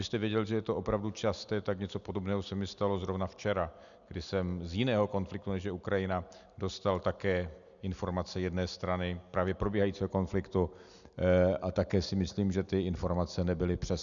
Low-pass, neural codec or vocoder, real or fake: 7.2 kHz; none; real